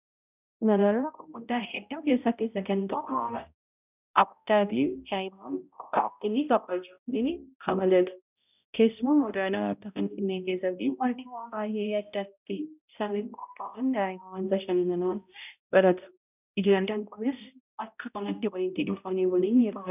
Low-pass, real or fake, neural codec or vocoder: 3.6 kHz; fake; codec, 16 kHz, 0.5 kbps, X-Codec, HuBERT features, trained on balanced general audio